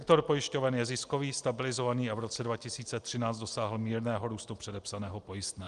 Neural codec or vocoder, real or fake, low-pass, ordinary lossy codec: none; real; 10.8 kHz; Opus, 32 kbps